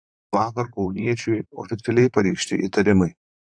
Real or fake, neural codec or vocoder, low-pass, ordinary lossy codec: fake; vocoder, 44.1 kHz, 128 mel bands, Pupu-Vocoder; 9.9 kHz; AAC, 64 kbps